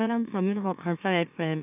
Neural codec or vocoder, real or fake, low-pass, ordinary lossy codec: autoencoder, 44.1 kHz, a latent of 192 numbers a frame, MeloTTS; fake; 3.6 kHz; none